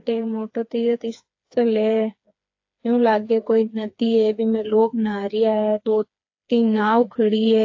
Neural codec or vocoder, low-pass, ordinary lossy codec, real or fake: codec, 16 kHz, 4 kbps, FreqCodec, smaller model; 7.2 kHz; AAC, 48 kbps; fake